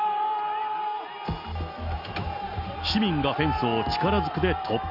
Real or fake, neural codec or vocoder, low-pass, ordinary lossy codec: real; none; 5.4 kHz; Opus, 64 kbps